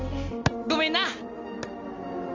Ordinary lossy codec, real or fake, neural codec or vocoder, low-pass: Opus, 32 kbps; real; none; 7.2 kHz